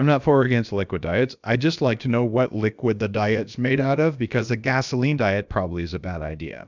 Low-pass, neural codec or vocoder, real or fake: 7.2 kHz; codec, 16 kHz, about 1 kbps, DyCAST, with the encoder's durations; fake